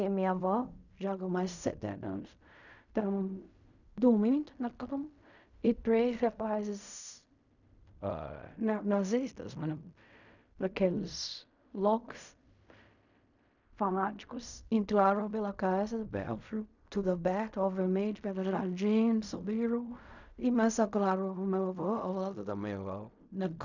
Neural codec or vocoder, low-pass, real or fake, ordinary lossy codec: codec, 16 kHz in and 24 kHz out, 0.4 kbps, LongCat-Audio-Codec, fine tuned four codebook decoder; 7.2 kHz; fake; none